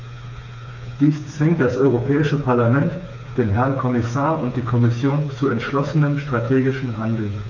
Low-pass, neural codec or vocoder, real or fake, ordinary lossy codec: 7.2 kHz; codec, 16 kHz, 4 kbps, FreqCodec, smaller model; fake; none